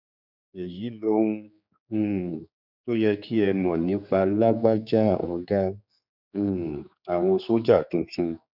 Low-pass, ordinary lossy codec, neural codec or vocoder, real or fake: 5.4 kHz; none; codec, 16 kHz, 4 kbps, X-Codec, HuBERT features, trained on general audio; fake